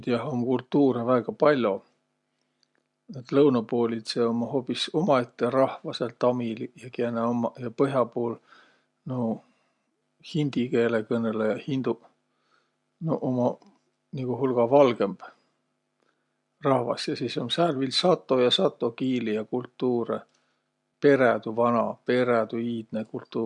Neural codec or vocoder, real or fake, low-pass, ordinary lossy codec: none; real; 10.8 kHz; MP3, 64 kbps